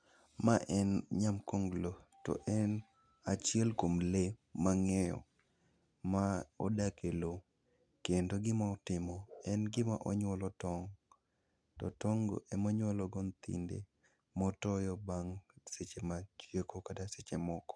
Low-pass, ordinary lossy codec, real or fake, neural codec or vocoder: 9.9 kHz; none; real; none